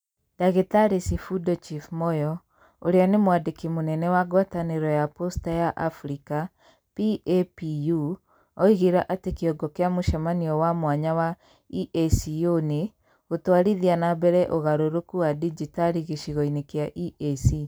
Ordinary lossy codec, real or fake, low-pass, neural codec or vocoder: none; real; none; none